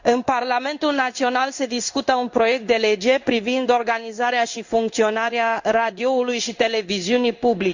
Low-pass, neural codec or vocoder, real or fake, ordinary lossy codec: 7.2 kHz; codec, 16 kHz in and 24 kHz out, 1 kbps, XY-Tokenizer; fake; Opus, 64 kbps